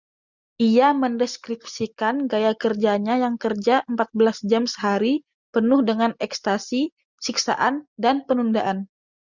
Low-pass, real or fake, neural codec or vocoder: 7.2 kHz; real; none